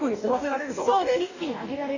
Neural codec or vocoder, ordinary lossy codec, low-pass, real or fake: codec, 44.1 kHz, 2.6 kbps, DAC; none; 7.2 kHz; fake